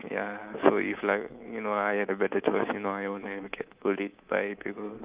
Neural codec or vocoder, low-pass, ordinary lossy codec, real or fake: codec, 24 kHz, 3.1 kbps, DualCodec; 3.6 kHz; Opus, 32 kbps; fake